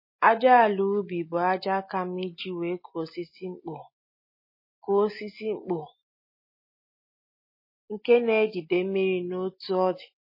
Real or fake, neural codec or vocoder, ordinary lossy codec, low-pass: real; none; MP3, 24 kbps; 5.4 kHz